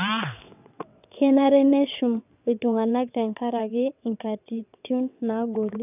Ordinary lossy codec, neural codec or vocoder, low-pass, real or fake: none; vocoder, 22.05 kHz, 80 mel bands, WaveNeXt; 3.6 kHz; fake